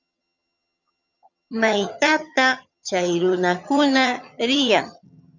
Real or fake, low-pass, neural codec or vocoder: fake; 7.2 kHz; vocoder, 22.05 kHz, 80 mel bands, HiFi-GAN